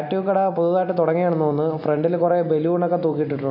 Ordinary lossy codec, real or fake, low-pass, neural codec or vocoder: none; real; 5.4 kHz; none